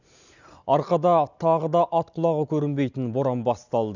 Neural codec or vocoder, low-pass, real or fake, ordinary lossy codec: none; 7.2 kHz; real; none